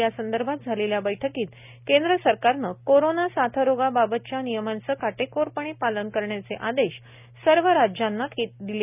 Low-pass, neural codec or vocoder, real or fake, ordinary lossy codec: 3.6 kHz; none; real; none